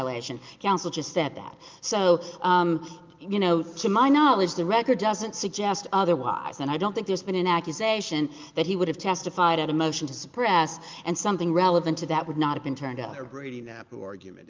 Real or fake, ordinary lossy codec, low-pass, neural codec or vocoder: real; Opus, 32 kbps; 7.2 kHz; none